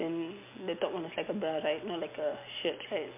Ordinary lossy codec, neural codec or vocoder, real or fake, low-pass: MP3, 32 kbps; none; real; 3.6 kHz